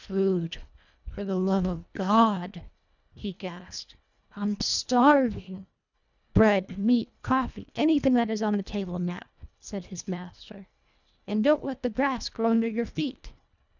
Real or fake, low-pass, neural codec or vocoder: fake; 7.2 kHz; codec, 24 kHz, 1.5 kbps, HILCodec